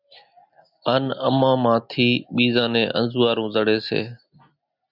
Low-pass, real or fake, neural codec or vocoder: 5.4 kHz; real; none